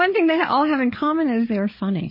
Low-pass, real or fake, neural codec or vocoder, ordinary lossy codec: 5.4 kHz; fake; codec, 16 kHz, 8 kbps, FreqCodec, larger model; MP3, 24 kbps